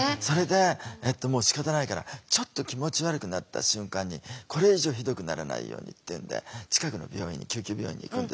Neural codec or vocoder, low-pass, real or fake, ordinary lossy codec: none; none; real; none